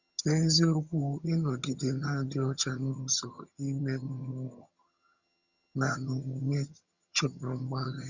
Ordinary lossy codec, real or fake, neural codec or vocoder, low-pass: Opus, 64 kbps; fake; vocoder, 22.05 kHz, 80 mel bands, HiFi-GAN; 7.2 kHz